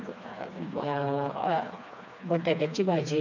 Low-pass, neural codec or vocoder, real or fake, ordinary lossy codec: 7.2 kHz; codec, 16 kHz, 2 kbps, FreqCodec, smaller model; fake; none